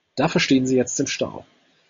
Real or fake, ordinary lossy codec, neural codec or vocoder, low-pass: real; AAC, 64 kbps; none; 7.2 kHz